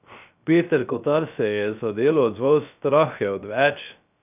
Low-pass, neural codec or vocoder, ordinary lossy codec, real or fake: 3.6 kHz; codec, 16 kHz, 0.3 kbps, FocalCodec; none; fake